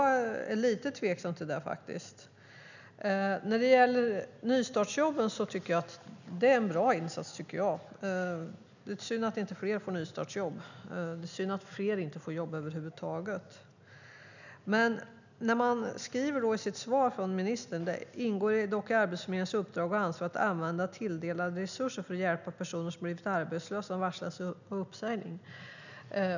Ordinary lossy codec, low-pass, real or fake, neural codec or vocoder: none; 7.2 kHz; real; none